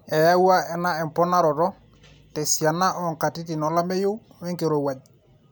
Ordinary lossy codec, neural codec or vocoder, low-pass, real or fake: none; none; none; real